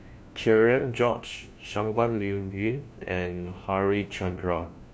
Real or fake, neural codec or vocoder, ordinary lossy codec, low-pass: fake; codec, 16 kHz, 1 kbps, FunCodec, trained on LibriTTS, 50 frames a second; none; none